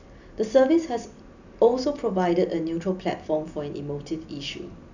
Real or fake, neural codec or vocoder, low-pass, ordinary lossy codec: real; none; 7.2 kHz; none